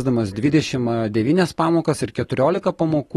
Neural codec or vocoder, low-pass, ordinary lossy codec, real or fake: none; 19.8 kHz; AAC, 32 kbps; real